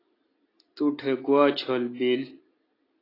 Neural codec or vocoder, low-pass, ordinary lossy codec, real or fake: none; 5.4 kHz; AAC, 24 kbps; real